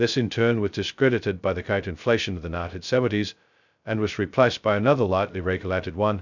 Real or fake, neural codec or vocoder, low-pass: fake; codec, 16 kHz, 0.2 kbps, FocalCodec; 7.2 kHz